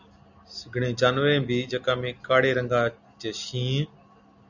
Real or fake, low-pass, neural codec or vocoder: real; 7.2 kHz; none